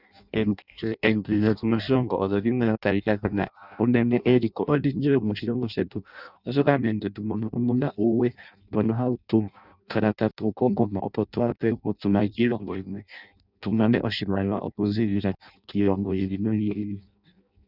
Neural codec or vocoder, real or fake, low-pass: codec, 16 kHz in and 24 kHz out, 0.6 kbps, FireRedTTS-2 codec; fake; 5.4 kHz